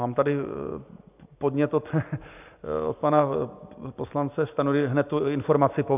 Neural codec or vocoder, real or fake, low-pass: none; real; 3.6 kHz